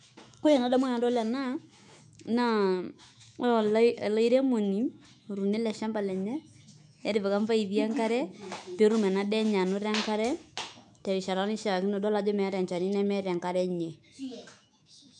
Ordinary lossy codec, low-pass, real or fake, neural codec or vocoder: none; 10.8 kHz; fake; autoencoder, 48 kHz, 128 numbers a frame, DAC-VAE, trained on Japanese speech